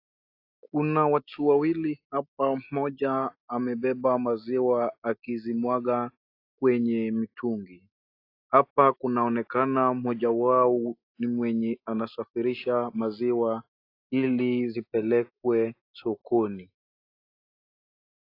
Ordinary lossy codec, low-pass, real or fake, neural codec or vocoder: AAC, 32 kbps; 5.4 kHz; real; none